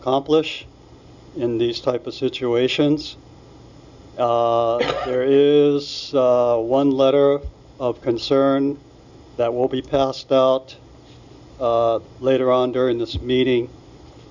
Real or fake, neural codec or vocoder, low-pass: real; none; 7.2 kHz